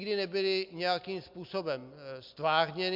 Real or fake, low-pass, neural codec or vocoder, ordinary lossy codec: real; 5.4 kHz; none; MP3, 48 kbps